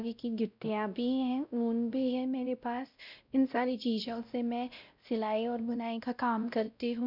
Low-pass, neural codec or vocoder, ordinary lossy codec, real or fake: 5.4 kHz; codec, 16 kHz, 0.5 kbps, X-Codec, WavLM features, trained on Multilingual LibriSpeech; none; fake